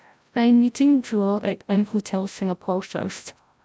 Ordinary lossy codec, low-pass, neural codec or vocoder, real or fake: none; none; codec, 16 kHz, 0.5 kbps, FreqCodec, larger model; fake